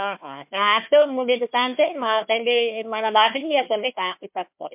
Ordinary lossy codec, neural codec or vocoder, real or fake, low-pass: MP3, 32 kbps; codec, 16 kHz, 1 kbps, FunCodec, trained on Chinese and English, 50 frames a second; fake; 3.6 kHz